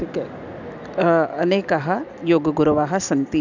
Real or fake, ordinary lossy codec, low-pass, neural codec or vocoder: real; none; 7.2 kHz; none